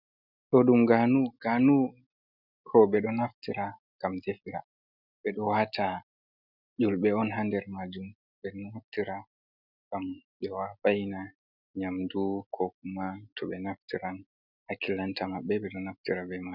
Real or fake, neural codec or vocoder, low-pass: real; none; 5.4 kHz